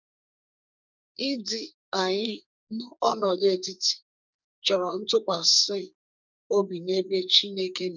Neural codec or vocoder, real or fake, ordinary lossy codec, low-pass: codec, 44.1 kHz, 2.6 kbps, SNAC; fake; none; 7.2 kHz